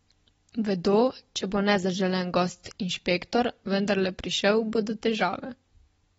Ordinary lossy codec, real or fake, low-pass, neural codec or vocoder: AAC, 24 kbps; real; 19.8 kHz; none